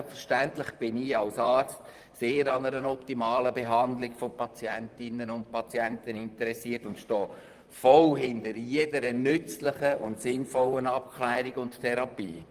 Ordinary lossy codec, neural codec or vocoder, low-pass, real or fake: Opus, 32 kbps; vocoder, 44.1 kHz, 128 mel bands, Pupu-Vocoder; 14.4 kHz; fake